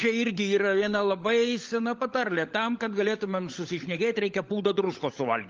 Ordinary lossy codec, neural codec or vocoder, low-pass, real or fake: Opus, 24 kbps; codec, 16 kHz, 16 kbps, FunCodec, trained on LibriTTS, 50 frames a second; 7.2 kHz; fake